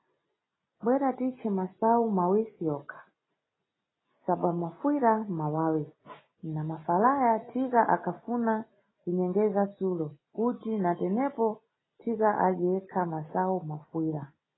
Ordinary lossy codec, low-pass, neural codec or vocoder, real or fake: AAC, 16 kbps; 7.2 kHz; none; real